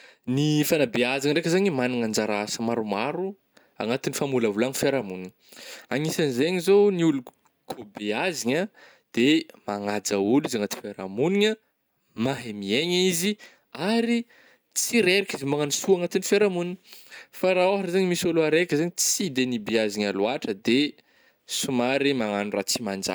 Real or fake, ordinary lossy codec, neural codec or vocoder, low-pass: real; none; none; none